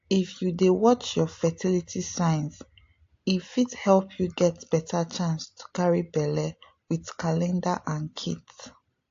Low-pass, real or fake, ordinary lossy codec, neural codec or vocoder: 7.2 kHz; real; AAC, 48 kbps; none